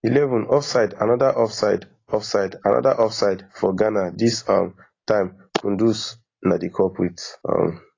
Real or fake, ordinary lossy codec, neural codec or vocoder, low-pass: real; AAC, 32 kbps; none; 7.2 kHz